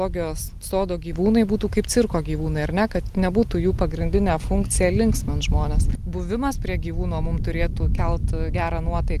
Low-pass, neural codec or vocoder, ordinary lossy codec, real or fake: 14.4 kHz; none; Opus, 24 kbps; real